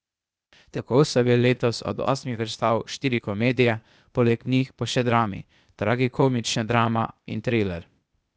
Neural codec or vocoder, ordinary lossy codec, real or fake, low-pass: codec, 16 kHz, 0.8 kbps, ZipCodec; none; fake; none